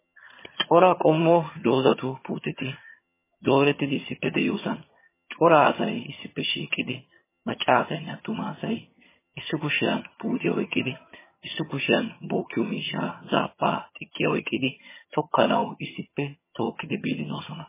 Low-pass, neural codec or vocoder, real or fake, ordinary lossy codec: 3.6 kHz; vocoder, 22.05 kHz, 80 mel bands, HiFi-GAN; fake; MP3, 16 kbps